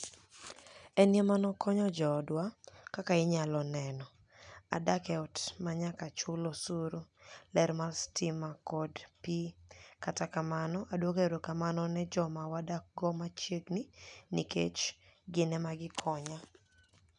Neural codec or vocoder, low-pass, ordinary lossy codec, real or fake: none; 9.9 kHz; none; real